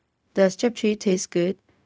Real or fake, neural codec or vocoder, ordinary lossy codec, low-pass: fake; codec, 16 kHz, 0.4 kbps, LongCat-Audio-Codec; none; none